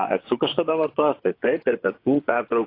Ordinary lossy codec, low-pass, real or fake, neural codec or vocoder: AAC, 32 kbps; 5.4 kHz; fake; vocoder, 24 kHz, 100 mel bands, Vocos